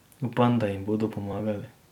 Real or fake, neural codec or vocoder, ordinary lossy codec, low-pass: fake; vocoder, 44.1 kHz, 128 mel bands every 256 samples, BigVGAN v2; none; 19.8 kHz